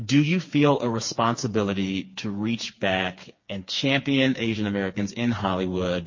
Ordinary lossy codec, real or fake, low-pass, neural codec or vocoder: MP3, 32 kbps; fake; 7.2 kHz; codec, 16 kHz, 4 kbps, FreqCodec, smaller model